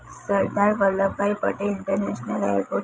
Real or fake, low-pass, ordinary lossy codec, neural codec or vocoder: fake; none; none; codec, 16 kHz, 16 kbps, FreqCodec, larger model